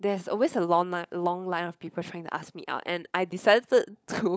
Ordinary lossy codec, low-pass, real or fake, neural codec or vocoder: none; none; fake; codec, 16 kHz, 16 kbps, FunCodec, trained on LibriTTS, 50 frames a second